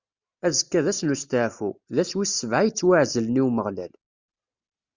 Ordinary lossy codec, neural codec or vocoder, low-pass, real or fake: Opus, 64 kbps; none; 7.2 kHz; real